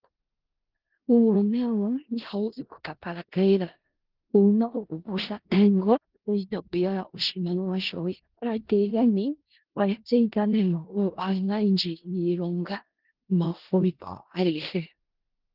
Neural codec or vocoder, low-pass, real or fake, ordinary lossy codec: codec, 16 kHz in and 24 kHz out, 0.4 kbps, LongCat-Audio-Codec, four codebook decoder; 5.4 kHz; fake; Opus, 16 kbps